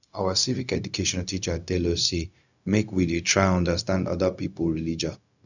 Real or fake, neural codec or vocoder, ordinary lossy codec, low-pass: fake; codec, 16 kHz, 0.4 kbps, LongCat-Audio-Codec; none; 7.2 kHz